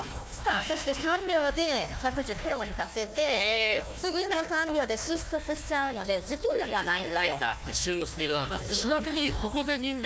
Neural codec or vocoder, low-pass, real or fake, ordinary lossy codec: codec, 16 kHz, 1 kbps, FunCodec, trained on Chinese and English, 50 frames a second; none; fake; none